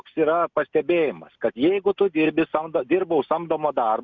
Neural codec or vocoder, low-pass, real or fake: none; 7.2 kHz; real